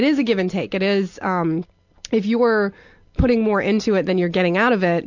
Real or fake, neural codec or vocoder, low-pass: real; none; 7.2 kHz